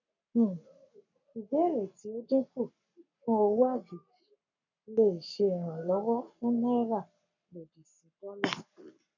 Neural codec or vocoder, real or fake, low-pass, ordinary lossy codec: codec, 44.1 kHz, 7.8 kbps, Pupu-Codec; fake; 7.2 kHz; none